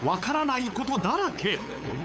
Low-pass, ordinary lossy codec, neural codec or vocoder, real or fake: none; none; codec, 16 kHz, 8 kbps, FunCodec, trained on LibriTTS, 25 frames a second; fake